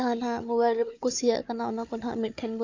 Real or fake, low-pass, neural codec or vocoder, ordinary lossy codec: fake; 7.2 kHz; codec, 16 kHz, 4 kbps, FunCodec, trained on Chinese and English, 50 frames a second; AAC, 48 kbps